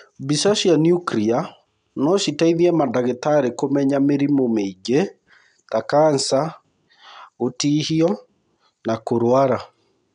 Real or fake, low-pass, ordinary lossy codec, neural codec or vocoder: real; 9.9 kHz; none; none